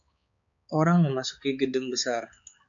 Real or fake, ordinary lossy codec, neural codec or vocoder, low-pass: fake; MP3, 96 kbps; codec, 16 kHz, 4 kbps, X-Codec, HuBERT features, trained on balanced general audio; 7.2 kHz